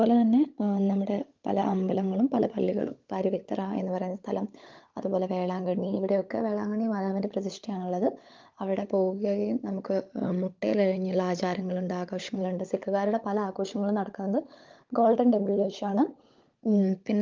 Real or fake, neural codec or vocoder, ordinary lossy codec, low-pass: fake; codec, 16 kHz, 16 kbps, FunCodec, trained on LibriTTS, 50 frames a second; Opus, 32 kbps; 7.2 kHz